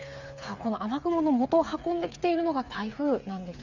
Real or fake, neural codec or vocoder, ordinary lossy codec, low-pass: fake; codec, 16 kHz, 8 kbps, FreqCodec, smaller model; none; 7.2 kHz